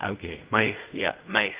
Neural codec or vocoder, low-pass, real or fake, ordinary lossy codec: codec, 16 kHz in and 24 kHz out, 0.4 kbps, LongCat-Audio-Codec, fine tuned four codebook decoder; 3.6 kHz; fake; Opus, 24 kbps